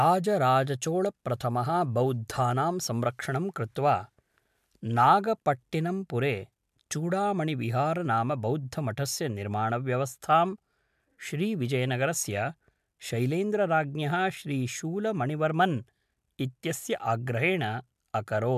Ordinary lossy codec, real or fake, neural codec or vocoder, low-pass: MP3, 96 kbps; real; none; 14.4 kHz